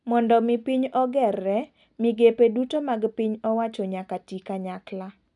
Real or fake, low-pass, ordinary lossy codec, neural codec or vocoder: real; 10.8 kHz; none; none